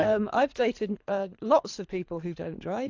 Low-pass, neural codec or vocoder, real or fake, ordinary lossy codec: 7.2 kHz; codec, 24 kHz, 3 kbps, HILCodec; fake; AAC, 48 kbps